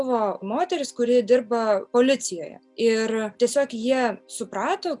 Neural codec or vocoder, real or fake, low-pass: none; real; 10.8 kHz